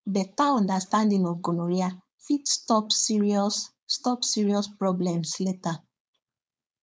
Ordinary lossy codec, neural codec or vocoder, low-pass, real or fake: none; codec, 16 kHz, 4.8 kbps, FACodec; none; fake